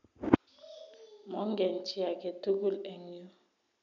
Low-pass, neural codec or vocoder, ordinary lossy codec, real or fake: 7.2 kHz; none; none; real